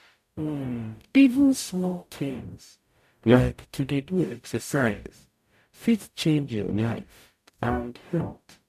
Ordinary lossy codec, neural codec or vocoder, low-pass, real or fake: MP3, 96 kbps; codec, 44.1 kHz, 0.9 kbps, DAC; 14.4 kHz; fake